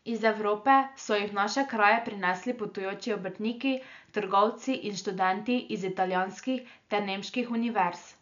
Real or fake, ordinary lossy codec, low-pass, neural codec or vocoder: real; MP3, 96 kbps; 7.2 kHz; none